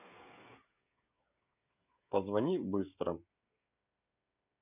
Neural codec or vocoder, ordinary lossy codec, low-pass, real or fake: codec, 16 kHz, 16 kbps, FreqCodec, smaller model; none; 3.6 kHz; fake